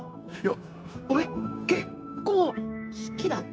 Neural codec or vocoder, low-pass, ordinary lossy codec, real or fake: codec, 16 kHz, 0.9 kbps, LongCat-Audio-Codec; none; none; fake